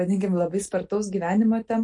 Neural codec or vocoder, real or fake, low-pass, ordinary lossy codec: none; real; 10.8 kHz; MP3, 48 kbps